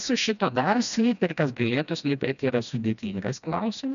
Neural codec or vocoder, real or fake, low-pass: codec, 16 kHz, 1 kbps, FreqCodec, smaller model; fake; 7.2 kHz